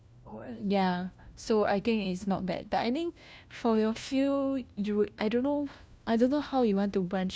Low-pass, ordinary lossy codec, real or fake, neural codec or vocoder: none; none; fake; codec, 16 kHz, 1 kbps, FunCodec, trained on LibriTTS, 50 frames a second